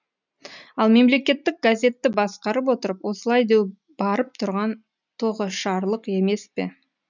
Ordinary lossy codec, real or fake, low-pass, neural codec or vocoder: none; real; 7.2 kHz; none